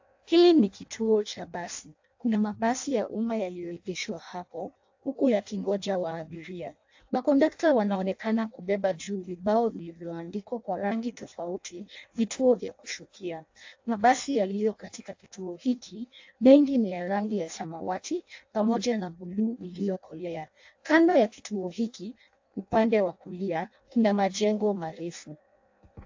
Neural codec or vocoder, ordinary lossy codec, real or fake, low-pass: codec, 16 kHz in and 24 kHz out, 0.6 kbps, FireRedTTS-2 codec; AAC, 48 kbps; fake; 7.2 kHz